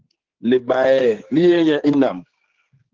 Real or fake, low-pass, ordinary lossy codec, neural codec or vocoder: fake; 7.2 kHz; Opus, 16 kbps; codec, 16 kHz, 4 kbps, X-Codec, HuBERT features, trained on general audio